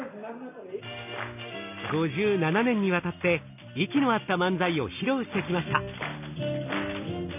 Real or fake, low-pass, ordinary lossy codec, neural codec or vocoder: real; 3.6 kHz; none; none